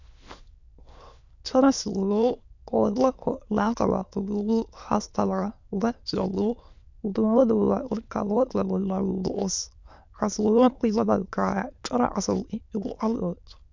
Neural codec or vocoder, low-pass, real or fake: autoencoder, 22.05 kHz, a latent of 192 numbers a frame, VITS, trained on many speakers; 7.2 kHz; fake